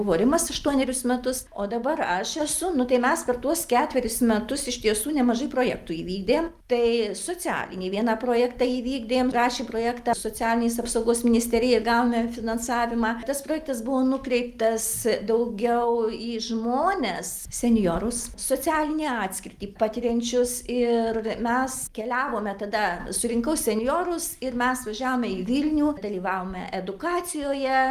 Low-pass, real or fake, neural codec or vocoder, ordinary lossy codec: 14.4 kHz; real; none; Opus, 32 kbps